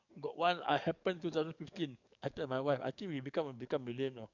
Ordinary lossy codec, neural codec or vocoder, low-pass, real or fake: none; codec, 44.1 kHz, 7.8 kbps, DAC; 7.2 kHz; fake